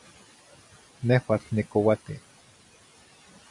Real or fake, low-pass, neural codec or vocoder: real; 10.8 kHz; none